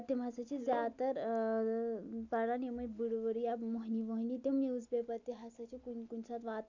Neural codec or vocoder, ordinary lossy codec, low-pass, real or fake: none; none; 7.2 kHz; real